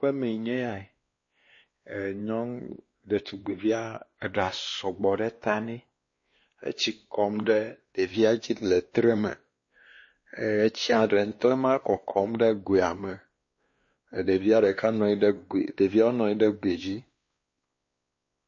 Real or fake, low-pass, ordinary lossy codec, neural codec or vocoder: fake; 7.2 kHz; MP3, 32 kbps; codec, 16 kHz, 2 kbps, X-Codec, WavLM features, trained on Multilingual LibriSpeech